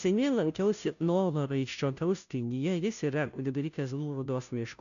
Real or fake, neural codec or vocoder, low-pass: fake; codec, 16 kHz, 0.5 kbps, FunCodec, trained on Chinese and English, 25 frames a second; 7.2 kHz